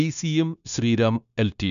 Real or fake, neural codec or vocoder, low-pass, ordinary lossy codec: fake; codec, 16 kHz, 0.9 kbps, LongCat-Audio-Codec; 7.2 kHz; MP3, 96 kbps